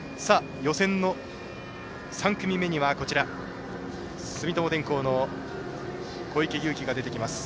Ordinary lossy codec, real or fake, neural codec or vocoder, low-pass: none; real; none; none